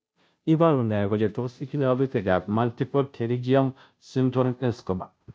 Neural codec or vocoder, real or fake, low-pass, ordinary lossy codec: codec, 16 kHz, 0.5 kbps, FunCodec, trained on Chinese and English, 25 frames a second; fake; none; none